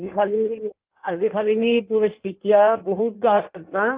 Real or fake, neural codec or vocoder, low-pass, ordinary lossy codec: fake; codec, 16 kHz in and 24 kHz out, 1.1 kbps, FireRedTTS-2 codec; 3.6 kHz; Opus, 32 kbps